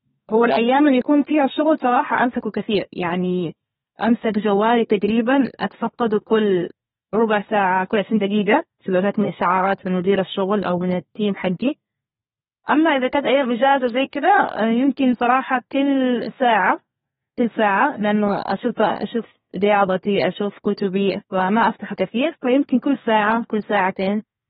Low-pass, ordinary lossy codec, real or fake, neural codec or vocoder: 14.4 kHz; AAC, 16 kbps; fake; codec, 32 kHz, 1.9 kbps, SNAC